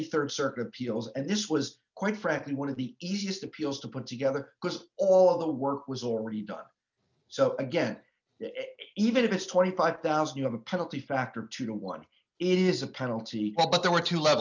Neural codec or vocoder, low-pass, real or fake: none; 7.2 kHz; real